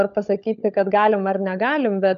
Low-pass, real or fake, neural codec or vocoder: 7.2 kHz; fake; codec, 16 kHz, 16 kbps, FunCodec, trained on LibriTTS, 50 frames a second